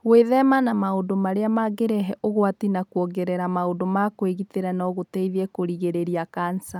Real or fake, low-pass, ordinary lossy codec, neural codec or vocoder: real; 19.8 kHz; none; none